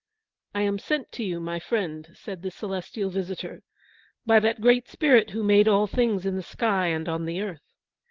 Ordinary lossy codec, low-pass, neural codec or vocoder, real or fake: Opus, 16 kbps; 7.2 kHz; none; real